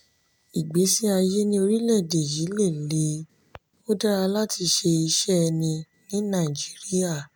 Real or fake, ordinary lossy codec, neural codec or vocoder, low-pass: real; none; none; none